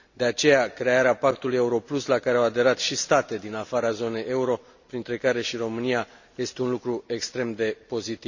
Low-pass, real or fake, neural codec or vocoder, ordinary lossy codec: 7.2 kHz; real; none; none